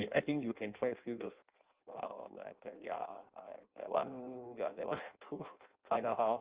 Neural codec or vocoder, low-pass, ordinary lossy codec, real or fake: codec, 16 kHz in and 24 kHz out, 0.6 kbps, FireRedTTS-2 codec; 3.6 kHz; Opus, 32 kbps; fake